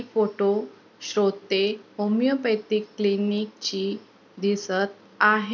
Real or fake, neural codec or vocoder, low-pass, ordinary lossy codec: real; none; 7.2 kHz; none